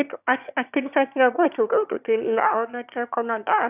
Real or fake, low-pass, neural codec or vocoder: fake; 3.6 kHz; autoencoder, 22.05 kHz, a latent of 192 numbers a frame, VITS, trained on one speaker